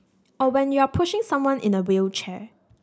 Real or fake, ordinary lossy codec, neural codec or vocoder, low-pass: real; none; none; none